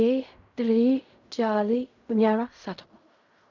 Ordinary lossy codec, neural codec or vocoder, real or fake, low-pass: none; codec, 16 kHz in and 24 kHz out, 0.4 kbps, LongCat-Audio-Codec, fine tuned four codebook decoder; fake; 7.2 kHz